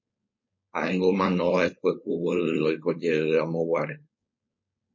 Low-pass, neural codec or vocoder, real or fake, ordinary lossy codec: 7.2 kHz; codec, 16 kHz, 4.8 kbps, FACodec; fake; MP3, 32 kbps